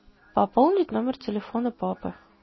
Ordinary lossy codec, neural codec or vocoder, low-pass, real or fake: MP3, 24 kbps; none; 7.2 kHz; real